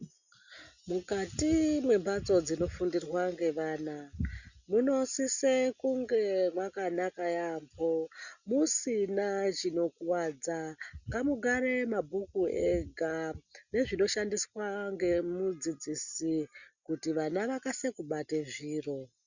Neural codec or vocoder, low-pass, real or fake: none; 7.2 kHz; real